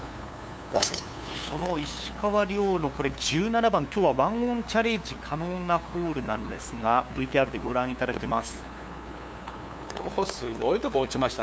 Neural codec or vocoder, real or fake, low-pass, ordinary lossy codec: codec, 16 kHz, 2 kbps, FunCodec, trained on LibriTTS, 25 frames a second; fake; none; none